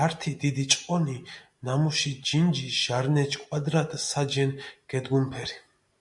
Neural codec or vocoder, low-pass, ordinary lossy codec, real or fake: none; 10.8 kHz; MP3, 64 kbps; real